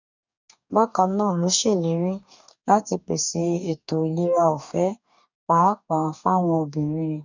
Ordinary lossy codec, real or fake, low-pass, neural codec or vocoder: none; fake; 7.2 kHz; codec, 44.1 kHz, 2.6 kbps, DAC